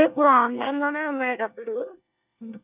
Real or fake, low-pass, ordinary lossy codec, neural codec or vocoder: fake; 3.6 kHz; none; codec, 24 kHz, 1 kbps, SNAC